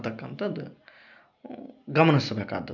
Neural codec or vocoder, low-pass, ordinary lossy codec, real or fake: none; 7.2 kHz; none; real